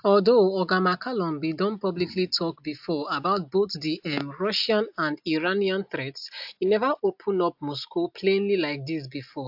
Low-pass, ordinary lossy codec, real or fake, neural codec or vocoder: 5.4 kHz; AAC, 48 kbps; real; none